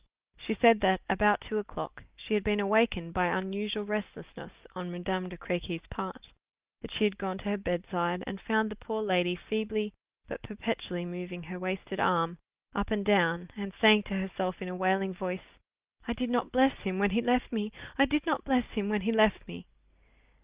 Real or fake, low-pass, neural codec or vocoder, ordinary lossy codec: real; 3.6 kHz; none; Opus, 32 kbps